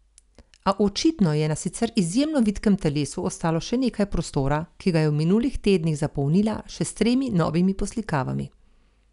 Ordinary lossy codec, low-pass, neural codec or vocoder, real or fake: none; 10.8 kHz; none; real